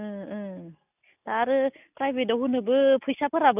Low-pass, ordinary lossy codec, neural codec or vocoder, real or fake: 3.6 kHz; none; none; real